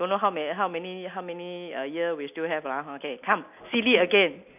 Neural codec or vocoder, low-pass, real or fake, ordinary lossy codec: none; 3.6 kHz; real; none